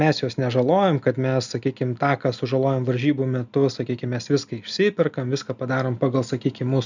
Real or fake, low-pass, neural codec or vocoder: real; 7.2 kHz; none